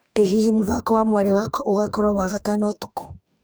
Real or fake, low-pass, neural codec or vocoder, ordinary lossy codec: fake; none; codec, 44.1 kHz, 2.6 kbps, DAC; none